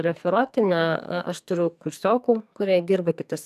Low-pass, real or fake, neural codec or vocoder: 14.4 kHz; fake; codec, 44.1 kHz, 2.6 kbps, SNAC